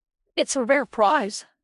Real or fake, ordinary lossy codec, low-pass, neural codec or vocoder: fake; none; 10.8 kHz; codec, 16 kHz in and 24 kHz out, 0.4 kbps, LongCat-Audio-Codec, four codebook decoder